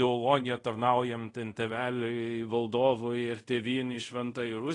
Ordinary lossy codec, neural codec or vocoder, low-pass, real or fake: AAC, 32 kbps; codec, 24 kHz, 0.5 kbps, DualCodec; 10.8 kHz; fake